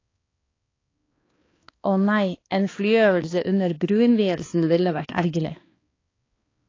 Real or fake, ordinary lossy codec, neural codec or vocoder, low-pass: fake; AAC, 32 kbps; codec, 16 kHz, 2 kbps, X-Codec, HuBERT features, trained on balanced general audio; 7.2 kHz